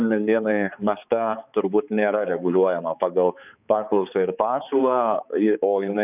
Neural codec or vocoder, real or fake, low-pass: codec, 16 kHz, 4 kbps, X-Codec, HuBERT features, trained on balanced general audio; fake; 3.6 kHz